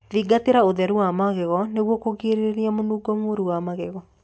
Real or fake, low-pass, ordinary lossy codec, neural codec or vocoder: real; none; none; none